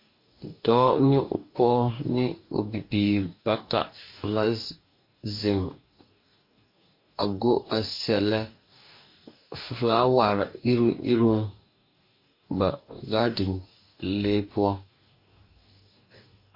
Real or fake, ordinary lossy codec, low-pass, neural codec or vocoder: fake; MP3, 32 kbps; 5.4 kHz; codec, 44.1 kHz, 2.6 kbps, DAC